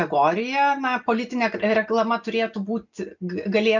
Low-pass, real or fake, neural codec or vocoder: 7.2 kHz; real; none